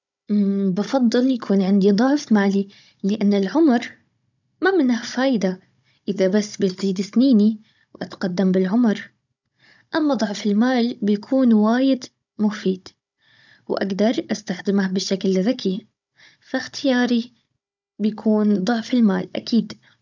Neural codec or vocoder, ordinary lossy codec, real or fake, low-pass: codec, 16 kHz, 16 kbps, FunCodec, trained on Chinese and English, 50 frames a second; none; fake; 7.2 kHz